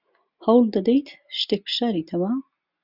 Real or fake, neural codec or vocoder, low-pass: real; none; 5.4 kHz